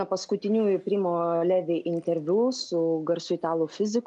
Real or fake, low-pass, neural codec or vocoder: real; 10.8 kHz; none